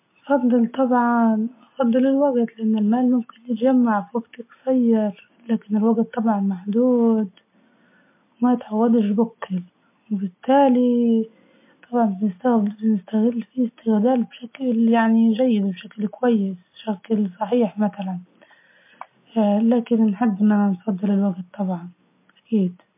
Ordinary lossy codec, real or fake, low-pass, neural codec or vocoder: MP3, 24 kbps; real; 3.6 kHz; none